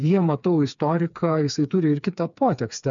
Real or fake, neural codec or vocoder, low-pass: fake; codec, 16 kHz, 4 kbps, FreqCodec, smaller model; 7.2 kHz